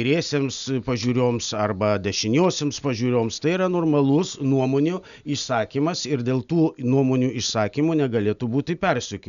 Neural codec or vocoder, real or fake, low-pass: none; real; 7.2 kHz